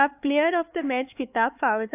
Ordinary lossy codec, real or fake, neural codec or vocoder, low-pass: AAC, 24 kbps; fake; codec, 16 kHz, 4 kbps, X-Codec, HuBERT features, trained on LibriSpeech; 3.6 kHz